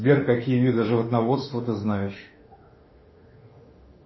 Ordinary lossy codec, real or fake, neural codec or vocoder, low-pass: MP3, 24 kbps; fake; autoencoder, 48 kHz, 32 numbers a frame, DAC-VAE, trained on Japanese speech; 7.2 kHz